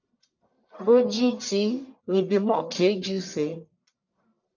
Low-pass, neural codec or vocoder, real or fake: 7.2 kHz; codec, 44.1 kHz, 1.7 kbps, Pupu-Codec; fake